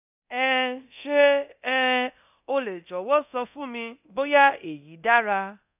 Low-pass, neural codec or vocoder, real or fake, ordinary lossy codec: 3.6 kHz; codec, 24 kHz, 0.9 kbps, DualCodec; fake; none